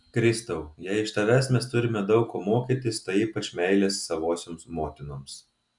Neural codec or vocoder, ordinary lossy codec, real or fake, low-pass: none; MP3, 96 kbps; real; 10.8 kHz